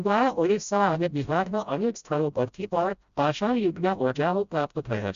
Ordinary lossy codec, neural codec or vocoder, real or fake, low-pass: Opus, 64 kbps; codec, 16 kHz, 0.5 kbps, FreqCodec, smaller model; fake; 7.2 kHz